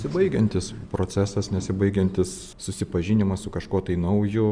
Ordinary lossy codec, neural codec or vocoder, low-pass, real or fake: Opus, 64 kbps; none; 9.9 kHz; real